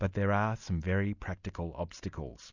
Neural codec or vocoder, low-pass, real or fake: none; 7.2 kHz; real